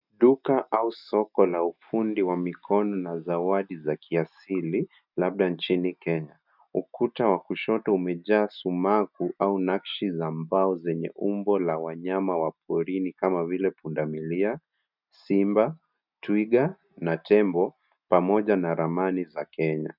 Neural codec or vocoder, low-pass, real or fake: none; 5.4 kHz; real